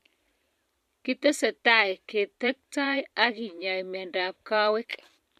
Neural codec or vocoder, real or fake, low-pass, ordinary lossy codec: vocoder, 44.1 kHz, 128 mel bands every 512 samples, BigVGAN v2; fake; 14.4 kHz; MP3, 64 kbps